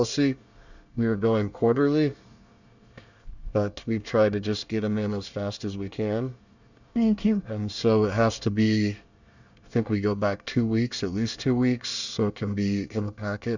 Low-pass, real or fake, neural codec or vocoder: 7.2 kHz; fake; codec, 24 kHz, 1 kbps, SNAC